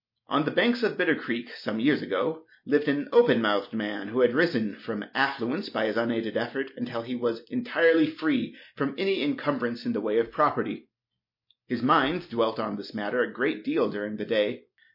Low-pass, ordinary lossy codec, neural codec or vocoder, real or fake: 5.4 kHz; MP3, 32 kbps; none; real